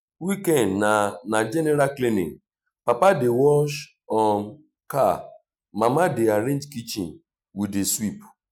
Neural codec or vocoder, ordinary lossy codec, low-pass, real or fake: none; none; 19.8 kHz; real